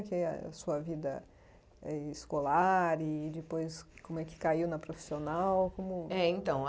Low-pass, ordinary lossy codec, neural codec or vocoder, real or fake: none; none; none; real